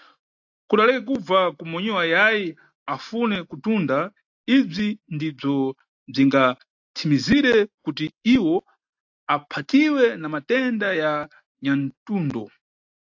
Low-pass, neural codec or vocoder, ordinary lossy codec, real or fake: 7.2 kHz; none; AAC, 48 kbps; real